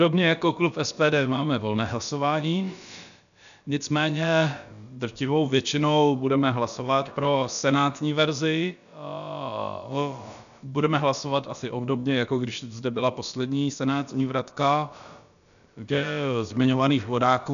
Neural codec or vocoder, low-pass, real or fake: codec, 16 kHz, about 1 kbps, DyCAST, with the encoder's durations; 7.2 kHz; fake